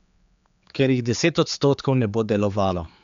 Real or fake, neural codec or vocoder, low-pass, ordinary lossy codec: fake; codec, 16 kHz, 4 kbps, X-Codec, HuBERT features, trained on general audio; 7.2 kHz; MP3, 64 kbps